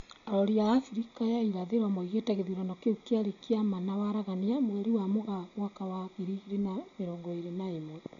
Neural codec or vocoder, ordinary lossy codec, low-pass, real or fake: none; none; 7.2 kHz; real